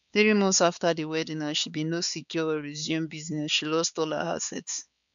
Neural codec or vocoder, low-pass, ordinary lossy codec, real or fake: codec, 16 kHz, 4 kbps, X-Codec, HuBERT features, trained on balanced general audio; 7.2 kHz; none; fake